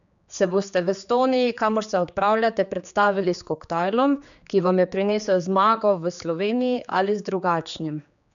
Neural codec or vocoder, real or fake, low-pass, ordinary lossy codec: codec, 16 kHz, 4 kbps, X-Codec, HuBERT features, trained on general audio; fake; 7.2 kHz; none